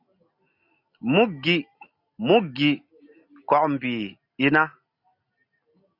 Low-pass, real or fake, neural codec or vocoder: 5.4 kHz; real; none